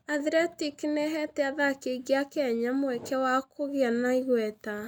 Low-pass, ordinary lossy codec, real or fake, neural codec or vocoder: none; none; real; none